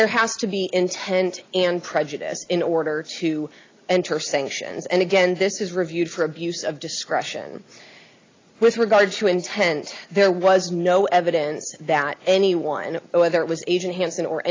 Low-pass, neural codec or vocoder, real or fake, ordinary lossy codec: 7.2 kHz; none; real; AAC, 32 kbps